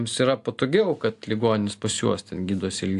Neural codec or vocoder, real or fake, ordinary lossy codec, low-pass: none; real; AAC, 64 kbps; 10.8 kHz